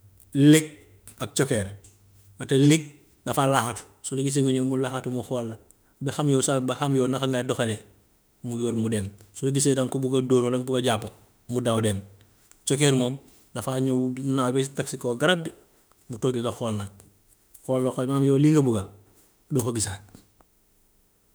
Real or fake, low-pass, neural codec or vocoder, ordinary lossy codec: fake; none; autoencoder, 48 kHz, 32 numbers a frame, DAC-VAE, trained on Japanese speech; none